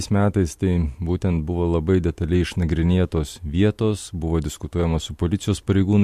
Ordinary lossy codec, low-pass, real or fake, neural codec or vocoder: MP3, 64 kbps; 14.4 kHz; real; none